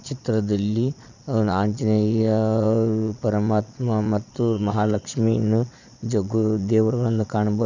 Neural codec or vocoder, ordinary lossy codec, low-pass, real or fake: vocoder, 22.05 kHz, 80 mel bands, Vocos; none; 7.2 kHz; fake